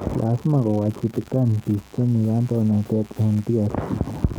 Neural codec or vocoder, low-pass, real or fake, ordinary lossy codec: codec, 44.1 kHz, 7.8 kbps, DAC; none; fake; none